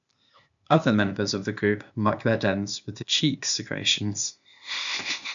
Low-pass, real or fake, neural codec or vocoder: 7.2 kHz; fake; codec, 16 kHz, 0.8 kbps, ZipCodec